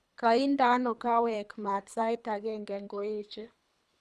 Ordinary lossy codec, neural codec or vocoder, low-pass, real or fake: none; codec, 24 kHz, 3 kbps, HILCodec; none; fake